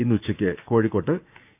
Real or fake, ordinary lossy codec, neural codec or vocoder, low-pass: fake; none; codec, 16 kHz, 6 kbps, DAC; 3.6 kHz